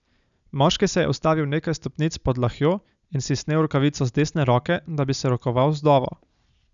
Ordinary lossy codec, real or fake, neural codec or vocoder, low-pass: none; real; none; 7.2 kHz